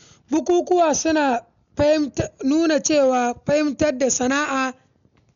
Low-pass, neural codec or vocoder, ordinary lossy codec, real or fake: 7.2 kHz; none; none; real